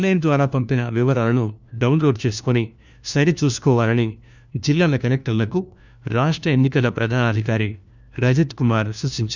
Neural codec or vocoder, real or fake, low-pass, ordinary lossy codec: codec, 16 kHz, 1 kbps, FunCodec, trained on LibriTTS, 50 frames a second; fake; 7.2 kHz; none